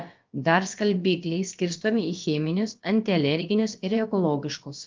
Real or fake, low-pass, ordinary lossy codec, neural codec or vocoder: fake; 7.2 kHz; Opus, 32 kbps; codec, 16 kHz, about 1 kbps, DyCAST, with the encoder's durations